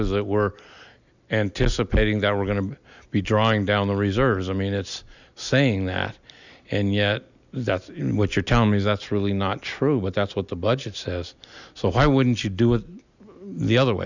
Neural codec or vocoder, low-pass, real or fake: none; 7.2 kHz; real